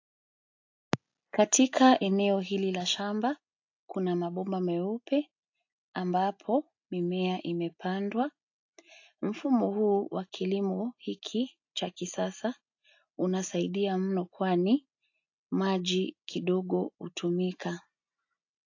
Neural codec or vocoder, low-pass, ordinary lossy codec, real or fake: none; 7.2 kHz; AAC, 48 kbps; real